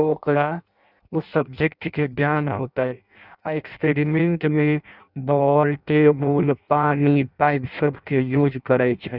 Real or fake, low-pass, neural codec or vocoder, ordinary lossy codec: fake; 5.4 kHz; codec, 16 kHz in and 24 kHz out, 0.6 kbps, FireRedTTS-2 codec; none